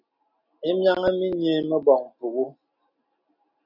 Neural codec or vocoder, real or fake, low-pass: none; real; 5.4 kHz